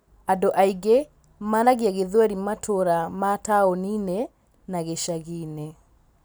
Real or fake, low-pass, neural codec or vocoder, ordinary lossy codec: real; none; none; none